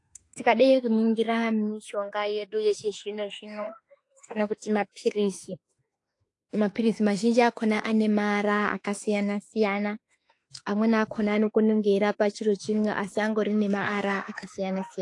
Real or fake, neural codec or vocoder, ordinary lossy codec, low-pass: fake; autoencoder, 48 kHz, 32 numbers a frame, DAC-VAE, trained on Japanese speech; AAC, 48 kbps; 10.8 kHz